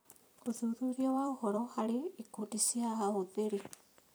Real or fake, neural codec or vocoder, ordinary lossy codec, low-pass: fake; vocoder, 44.1 kHz, 128 mel bands every 256 samples, BigVGAN v2; none; none